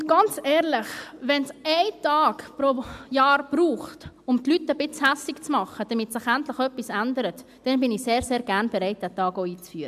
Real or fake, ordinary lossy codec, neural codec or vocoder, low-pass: real; AAC, 96 kbps; none; 14.4 kHz